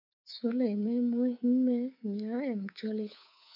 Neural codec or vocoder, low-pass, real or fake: codec, 24 kHz, 3.1 kbps, DualCodec; 5.4 kHz; fake